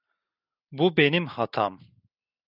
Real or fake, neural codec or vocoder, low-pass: real; none; 5.4 kHz